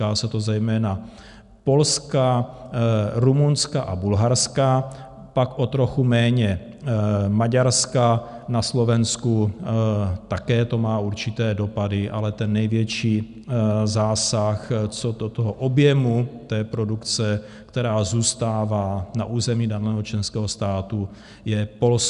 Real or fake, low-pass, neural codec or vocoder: real; 10.8 kHz; none